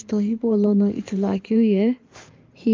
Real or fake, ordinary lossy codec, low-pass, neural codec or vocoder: fake; Opus, 32 kbps; 7.2 kHz; codec, 16 kHz in and 24 kHz out, 2.2 kbps, FireRedTTS-2 codec